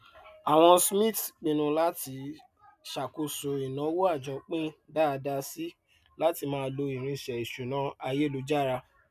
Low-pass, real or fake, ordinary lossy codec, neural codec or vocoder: 14.4 kHz; real; none; none